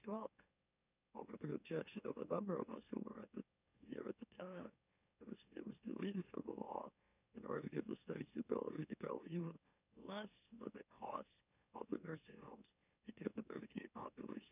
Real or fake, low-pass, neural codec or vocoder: fake; 3.6 kHz; autoencoder, 44.1 kHz, a latent of 192 numbers a frame, MeloTTS